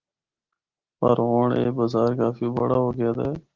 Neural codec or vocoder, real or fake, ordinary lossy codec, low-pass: none; real; Opus, 16 kbps; 7.2 kHz